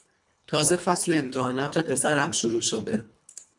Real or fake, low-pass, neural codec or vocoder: fake; 10.8 kHz; codec, 24 kHz, 1.5 kbps, HILCodec